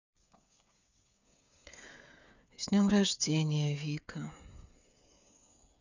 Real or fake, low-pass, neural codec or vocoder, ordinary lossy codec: fake; 7.2 kHz; codec, 16 kHz, 8 kbps, FreqCodec, smaller model; none